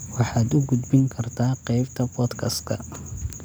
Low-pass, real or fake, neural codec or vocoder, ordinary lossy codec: none; real; none; none